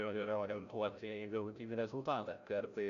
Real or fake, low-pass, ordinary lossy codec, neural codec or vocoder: fake; 7.2 kHz; AAC, 96 kbps; codec, 16 kHz, 0.5 kbps, FreqCodec, larger model